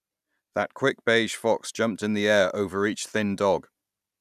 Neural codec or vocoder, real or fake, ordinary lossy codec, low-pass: none; real; none; 14.4 kHz